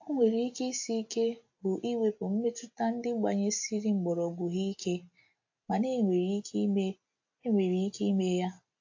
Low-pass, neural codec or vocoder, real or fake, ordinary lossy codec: 7.2 kHz; none; real; AAC, 48 kbps